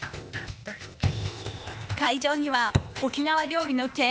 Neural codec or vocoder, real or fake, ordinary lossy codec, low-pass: codec, 16 kHz, 0.8 kbps, ZipCodec; fake; none; none